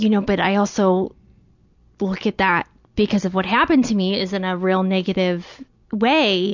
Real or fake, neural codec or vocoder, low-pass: real; none; 7.2 kHz